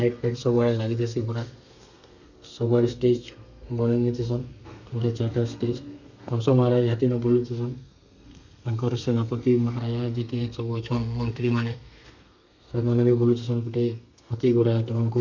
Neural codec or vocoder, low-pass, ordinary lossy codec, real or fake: codec, 32 kHz, 1.9 kbps, SNAC; 7.2 kHz; none; fake